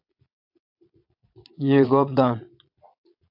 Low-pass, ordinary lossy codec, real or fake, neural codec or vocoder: 5.4 kHz; AAC, 48 kbps; fake; vocoder, 22.05 kHz, 80 mel bands, Vocos